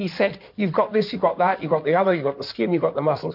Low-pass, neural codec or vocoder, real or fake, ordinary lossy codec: 5.4 kHz; codec, 16 kHz in and 24 kHz out, 2.2 kbps, FireRedTTS-2 codec; fake; MP3, 48 kbps